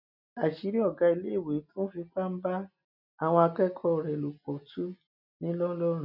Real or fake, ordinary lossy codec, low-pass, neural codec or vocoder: real; none; 5.4 kHz; none